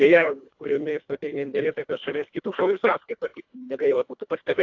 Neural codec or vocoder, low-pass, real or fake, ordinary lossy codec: codec, 24 kHz, 1.5 kbps, HILCodec; 7.2 kHz; fake; AAC, 48 kbps